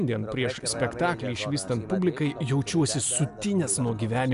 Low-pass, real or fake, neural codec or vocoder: 10.8 kHz; real; none